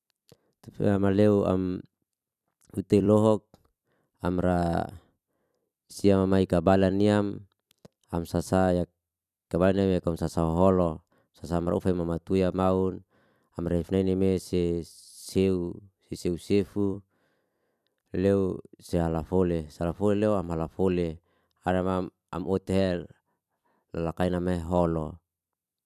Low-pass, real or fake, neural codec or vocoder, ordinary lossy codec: 14.4 kHz; fake; vocoder, 44.1 kHz, 128 mel bands every 256 samples, BigVGAN v2; none